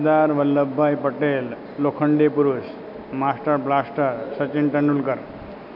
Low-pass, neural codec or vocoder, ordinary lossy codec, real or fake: 5.4 kHz; none; none; real